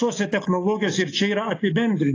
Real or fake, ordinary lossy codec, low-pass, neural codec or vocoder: real; AAC, 32 kbps; 7.2 kHz; none